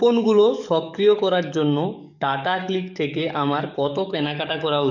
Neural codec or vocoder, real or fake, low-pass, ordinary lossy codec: codec, 44.1 kHz, 7.8 kbps, DAC; fake; 7.2 kHz; none